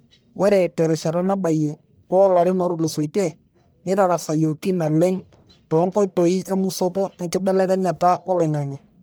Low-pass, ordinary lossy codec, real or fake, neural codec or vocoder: none; none; fake; codec, 44.1 kHz, 1.7 kbps, Pupu-Codec